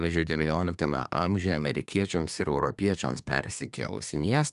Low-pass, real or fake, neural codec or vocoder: 10.8 kHz; fake; codec, 24 kHz, 1 kbps, SNAC